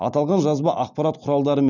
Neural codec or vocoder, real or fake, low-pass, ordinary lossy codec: none; real; 7.2 kHz; none